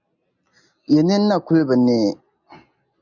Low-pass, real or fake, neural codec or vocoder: 7.2 kHz; real; none